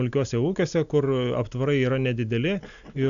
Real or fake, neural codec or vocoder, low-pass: real; none; 7.2 kHz